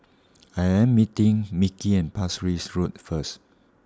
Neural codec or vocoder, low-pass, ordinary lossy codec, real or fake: none; none; none; real